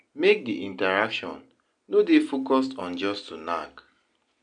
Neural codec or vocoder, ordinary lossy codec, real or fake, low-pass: none; none; real; 9.9 kHz